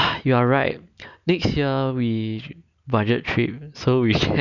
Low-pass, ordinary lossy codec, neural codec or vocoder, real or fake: 7.2 kHz; none; none; real